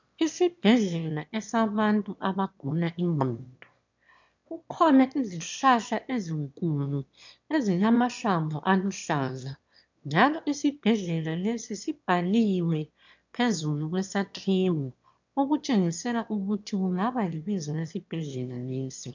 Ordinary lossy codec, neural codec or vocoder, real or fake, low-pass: MP3, 64 kbps; autoencoder, 22.05 kHz, a latent of 192 numbers a frame, VITS, trained on one speaker; fake; 7.2 kHz